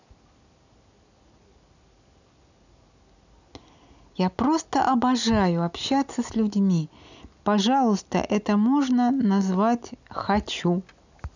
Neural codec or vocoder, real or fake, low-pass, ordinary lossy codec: none; real; 7.2 kHz; none